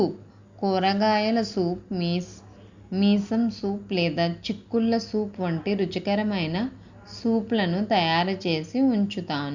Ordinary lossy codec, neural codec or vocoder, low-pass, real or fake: Opus, 64 kbps; none; 7.2 kHz; real